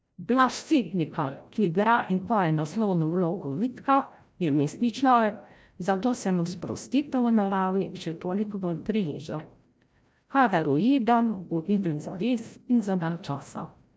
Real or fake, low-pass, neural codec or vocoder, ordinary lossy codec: fake; none; codec, 16 kHz, 0.5 kbps, FreqCodec, larger model; none